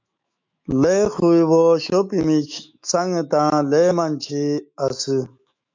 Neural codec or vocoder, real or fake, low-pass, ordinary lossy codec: autoencoder, 48 kHz, 128 numbers a frame, DAC-VAE, trained on Japanese speech; fake; 7.2 kHz; MP3, 64 kbps